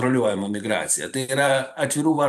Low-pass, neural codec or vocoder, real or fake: 14.4 kHz; vocoder, 44.1 kHz, 128 mel bands every 512 samples, BigVGAN v2; fake